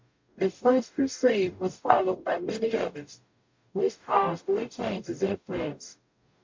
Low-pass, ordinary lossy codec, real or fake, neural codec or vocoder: 7.2 kHz; MP3, 48 kbps; fake; codec, 44.1 kHz, 0.9 kbps, DAC